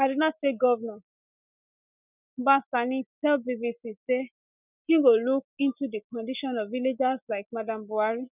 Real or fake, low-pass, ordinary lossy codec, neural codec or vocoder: real; 3.6 kHz; none; none